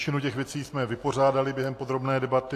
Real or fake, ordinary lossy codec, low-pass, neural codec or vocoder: real; AAC, 64 kbps; 14.4 kHz; none